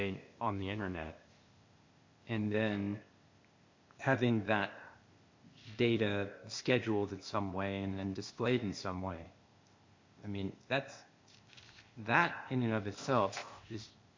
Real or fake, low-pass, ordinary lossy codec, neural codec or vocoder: fake; 7.2 kHz; MP3, 48 kbps; codec, 16 kHz, 0.8 kbps, ZipCodec